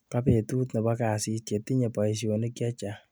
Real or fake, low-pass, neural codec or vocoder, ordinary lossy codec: real; none; none; none